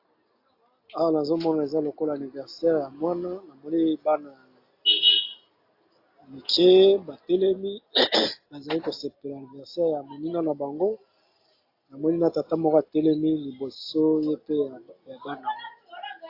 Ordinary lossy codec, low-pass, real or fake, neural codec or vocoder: AAC, 32 kbps; 5.4 kHz; real; none